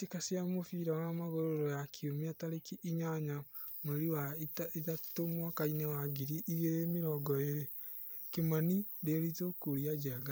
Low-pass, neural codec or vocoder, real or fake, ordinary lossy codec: none; vocoder, 44.1 kHz, 128 mel bands every 256 samples, BigVGAN v2; fake; none